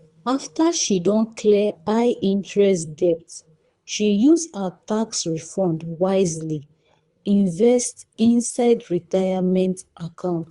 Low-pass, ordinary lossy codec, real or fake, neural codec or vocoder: 10.8 kHz; Opus, 64 kbps; fake; codec, 24 kHz, 3 kbps, HILCodec